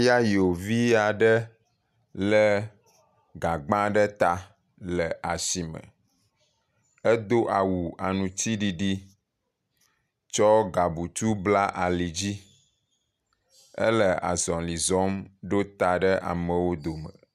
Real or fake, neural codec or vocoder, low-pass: real; none; 14.4 kHz